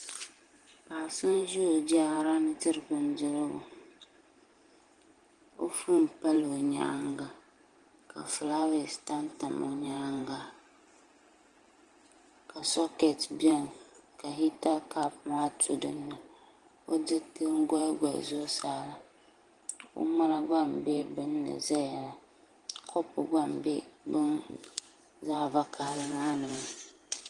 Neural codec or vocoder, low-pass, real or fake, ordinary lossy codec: vocoder, 44.1 kHz, 128 mel bands every 512 samples, BigVGAN v2; 10.8 kHz; fake; Opus, 24 kbps